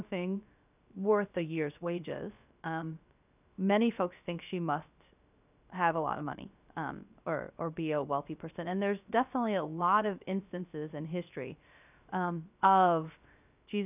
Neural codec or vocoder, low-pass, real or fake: codec, 16 kHz, 0.3 kbps, FocalCodec; 3.6 kHz; fake